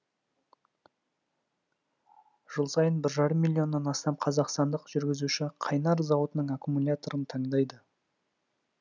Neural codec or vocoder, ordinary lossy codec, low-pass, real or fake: none; none; 7.2 kHz; real